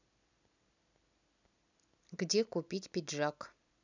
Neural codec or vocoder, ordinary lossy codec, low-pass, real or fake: none; none; 7.2 kHz; real